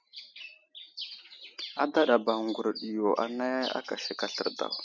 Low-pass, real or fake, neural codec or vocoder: 7.2 kHz; real; none